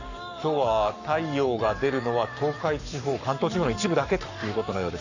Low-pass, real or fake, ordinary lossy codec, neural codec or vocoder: 7.2 kHz; fake; none; codec, 44.1 kHz, 7.8 kbps, Pupu-Codec